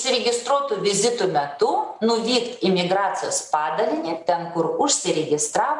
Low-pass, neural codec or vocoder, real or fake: 10.8 kHz; none; real